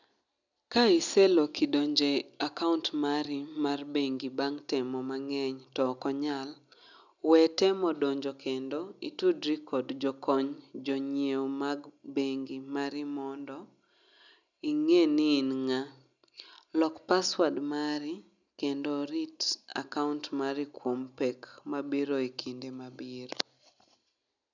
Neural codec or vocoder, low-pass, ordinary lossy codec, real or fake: none; 7.2 kHz; none; real